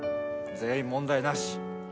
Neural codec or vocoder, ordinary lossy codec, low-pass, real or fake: none; none; none; real